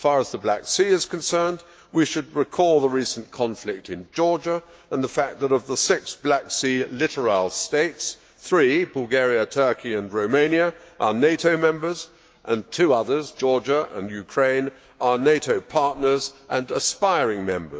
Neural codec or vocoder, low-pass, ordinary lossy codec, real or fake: codec, 16 kHz, 6 kbps, DAC; none; none; fake